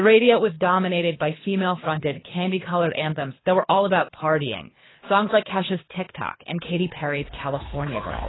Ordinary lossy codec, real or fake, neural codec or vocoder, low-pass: AAC, 16 kbps; fake; codec, 24 kHz, 3 kbps, HILCodec; 7.2 kHz